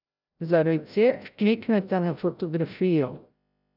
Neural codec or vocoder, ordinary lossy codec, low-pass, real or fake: codec, 16 kHz, 0.5 kbps, FreqCodec, larger model; none; 5.4 kHz; fake